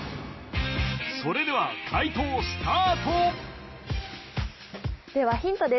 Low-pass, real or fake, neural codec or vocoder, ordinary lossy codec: 7.2 kHz; real; none; MP3, 24 kbps